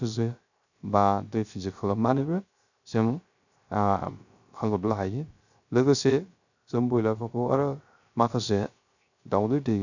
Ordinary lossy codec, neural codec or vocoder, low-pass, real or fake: none; codec, 16 kHz, 0.3 kbps, FocalCodec; 7.2 kHz; fake